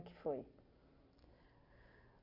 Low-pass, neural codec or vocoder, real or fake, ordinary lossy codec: 5.4 kHz; none; real; none